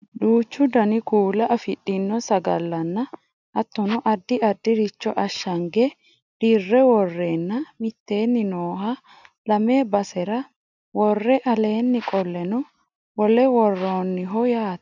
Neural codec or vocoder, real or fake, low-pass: none; real; 7.2 kHz